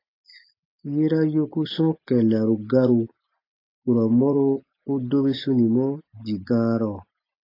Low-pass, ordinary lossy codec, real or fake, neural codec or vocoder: 5.4 kHz; AAC, 32 kbps; real; none